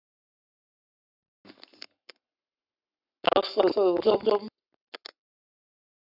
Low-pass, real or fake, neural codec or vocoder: 5.4 kHz; fake; codec, 44.1 kHz, 7.8 kbps, Pupu-Codec